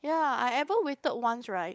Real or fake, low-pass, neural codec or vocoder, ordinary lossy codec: fake; none; codec, 16 kHz, 16 kbps, FunCodec, trained on LibriTTS, 50 frames a second; none